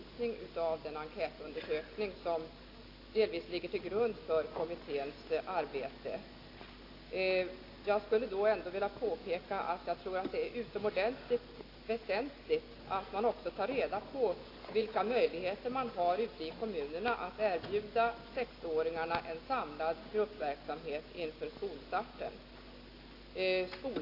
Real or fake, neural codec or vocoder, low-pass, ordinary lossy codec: real; none; 5.4 kHz; AAC, 32 kbps